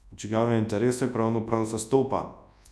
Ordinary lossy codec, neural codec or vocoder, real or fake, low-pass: none; codec, 24 kHz, 0.9 kbps, WavTokenizer, large speech release; fake; none